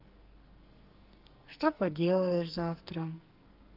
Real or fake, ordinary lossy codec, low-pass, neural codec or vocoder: fake; Opus, 32 kbps; 5.4 kHz; codec, 44.1 kHz, 2.6 kbps, SNAC